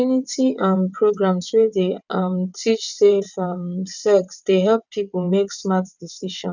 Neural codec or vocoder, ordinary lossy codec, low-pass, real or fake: vocoder, 22.05 kHz, 80 mel bands, WaveNeXt; none; 7.2 kHz; fake